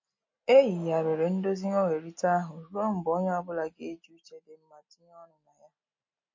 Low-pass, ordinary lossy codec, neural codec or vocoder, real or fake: 7.2 kHz; MP3, 32 kbps; none; real